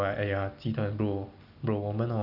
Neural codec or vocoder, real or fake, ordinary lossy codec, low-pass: none; real; none; 5.4 kHz